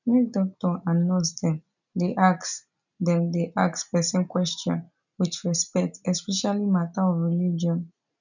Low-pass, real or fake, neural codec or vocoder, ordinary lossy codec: 7.2 kHz; real; none; none